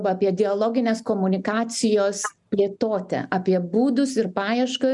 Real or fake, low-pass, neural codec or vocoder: real; 10.8 kHz; none